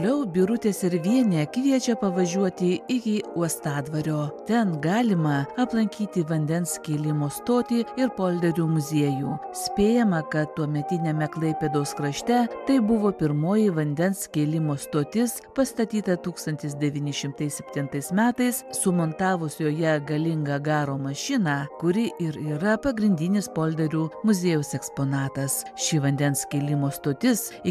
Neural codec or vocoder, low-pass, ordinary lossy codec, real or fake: none; 14.4 kHz; MP3, 96 kbps; real